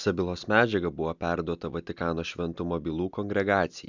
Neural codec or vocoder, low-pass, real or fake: none; 7.2 kHz; real